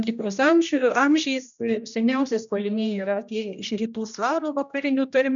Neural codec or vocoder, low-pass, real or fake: codec, 16 kHz, 1 kbps, X-Codec, HuBERT features, trained on general audio; 7.2 kHz; fake